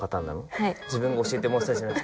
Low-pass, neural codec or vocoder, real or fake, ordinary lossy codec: none; none; real; none